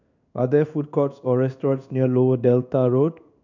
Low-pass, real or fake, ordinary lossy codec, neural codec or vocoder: 7.2 kHz; fake; none; codec, 16 kHz in and 24 kHz out, 1 kbps, XY-Tokenizer